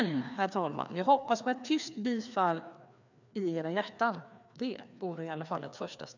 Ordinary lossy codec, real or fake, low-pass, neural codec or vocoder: none; fake; 7.2 kHz; codec, 16 kHz, 2 kbps, FreqCodec, larger model